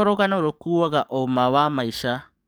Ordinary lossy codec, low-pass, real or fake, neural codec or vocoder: none; none; fake; codec, 44.1 kHz, 7.8 kbps, DAC